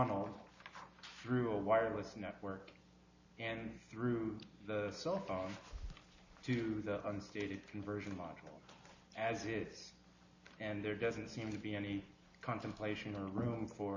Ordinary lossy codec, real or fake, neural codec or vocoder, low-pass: AAC, 48 kbps; real; none; 7.2 kHz